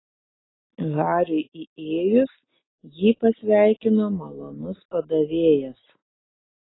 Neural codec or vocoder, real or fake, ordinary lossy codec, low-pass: none; real; AAC, 16 kbps; 7.2 kHz